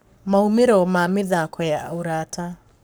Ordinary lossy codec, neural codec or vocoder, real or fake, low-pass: none; codec, 44.1 kHz, 7.8 kbps, Pupu-Codec; fake; none